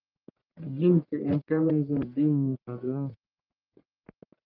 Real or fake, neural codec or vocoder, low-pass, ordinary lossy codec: fake; codec, 44.1 kHz, 1.7 kbps, Pupu-Codec; 5.4 kHz; Opus, 24 kbps